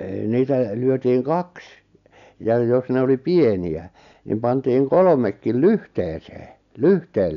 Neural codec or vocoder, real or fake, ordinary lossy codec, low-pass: none; real; none; 7.2 kHz